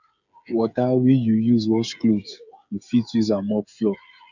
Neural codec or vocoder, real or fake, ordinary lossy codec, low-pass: codec, 16 kHz, 8 kbps, FreqCodec, smaller model; fake; none; 7.2 kHz